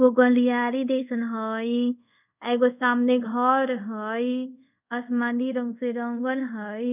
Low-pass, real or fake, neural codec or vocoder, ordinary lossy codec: 3.6 kHz; fake; codec, 24 kHz, 0.5 kbps, DualCodec; none